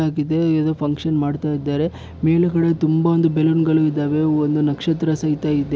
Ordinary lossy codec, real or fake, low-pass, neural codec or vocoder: none; real; none; none